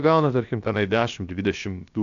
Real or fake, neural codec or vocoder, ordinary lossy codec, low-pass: fake; codec, 16 kHz, about 1 kbps, DyCAST, with the encoder's durations; AAC, 64 kbps; 7.2 kHz